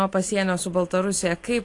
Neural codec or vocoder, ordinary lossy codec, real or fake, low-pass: none; AAC, 48 kbps; real; 10.8 kHz